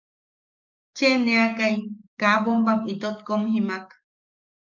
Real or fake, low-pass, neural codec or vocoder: fake; 7.2 kHz; codec, 44.1 kHz, 7.8 kbps, DAC